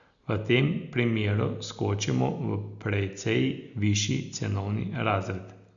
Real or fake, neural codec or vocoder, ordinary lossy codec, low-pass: real; none; none; 7.2 kHz